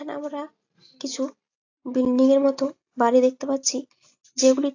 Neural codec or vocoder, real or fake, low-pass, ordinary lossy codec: none; real; 7.2 kHz; AAC, 48 kbps